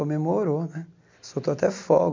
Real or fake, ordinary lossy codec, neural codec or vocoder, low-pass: real; MP3, 48 kbps; none; 7.2 kHz